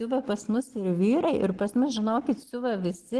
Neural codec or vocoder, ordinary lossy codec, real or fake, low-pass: none; Opus, 16 kbps; real; 10.8 kHz